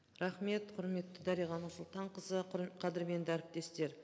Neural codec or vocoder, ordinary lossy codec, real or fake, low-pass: none; none; real; none